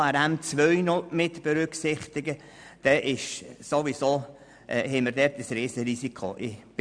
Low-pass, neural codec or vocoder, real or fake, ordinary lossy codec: 9.9 kHz; none; real; none